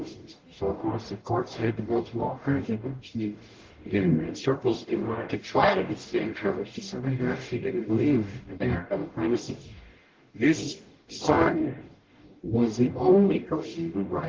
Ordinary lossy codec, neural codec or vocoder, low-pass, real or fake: Opus, 16 kbps; codec, 44.1 kHz, 0.9 kbps, DAC; 7.2 kHz; fake